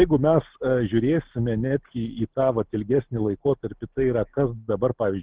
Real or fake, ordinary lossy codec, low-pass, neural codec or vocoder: real; Opus, 16 kbps; 3.6 kHz; none